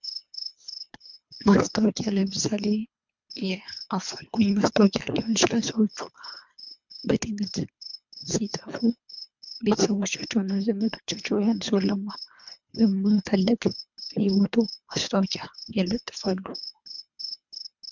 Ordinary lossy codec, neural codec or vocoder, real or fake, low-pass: MP3, 64 kbps; codec, 24 kHz, 3 kbps, HILCodec; fake; 7.2 kHz